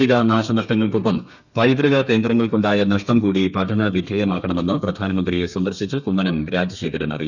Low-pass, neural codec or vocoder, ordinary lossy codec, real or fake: 7.2 kHz; codec, 32 kHz, 1.9 kbps, SNAC; none; fake